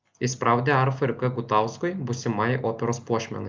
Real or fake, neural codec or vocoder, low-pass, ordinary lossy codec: real; none; 7.2 kHz; Opus, 24 kbps